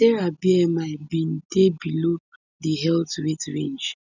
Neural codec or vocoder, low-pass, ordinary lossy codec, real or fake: none; 7.2 kHz; none; real